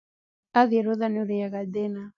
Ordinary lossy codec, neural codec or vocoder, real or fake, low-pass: none; none; real; 7.2 kHz